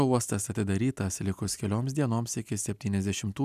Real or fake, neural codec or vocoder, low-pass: real; none; 14.4 kHz